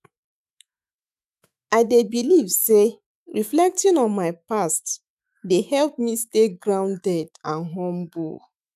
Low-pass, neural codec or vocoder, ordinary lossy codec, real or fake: 14.4 kHz; autoencoder, 48 kHz, 128 numbers a frame, DAC-VAE, trained on Japanese speech; none; fake